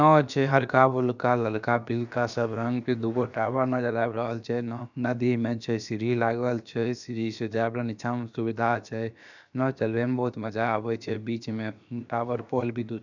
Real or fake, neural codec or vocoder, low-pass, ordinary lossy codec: fake; codec, 16 kHz, about 1 kbps, DyCAST, with the encoder's durations; 7.2 kHz; none